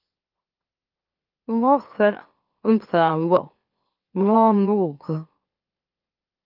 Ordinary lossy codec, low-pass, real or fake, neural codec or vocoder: Opus, 24 kbps; 5.4 kHz; fake; autoencoder, 44.1 kHz, a latent of 192 numbers a frame, MeloTTS